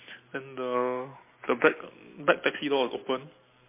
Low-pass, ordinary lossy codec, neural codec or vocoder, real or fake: 3.6 kHz; MP3, 24 kbps; none; real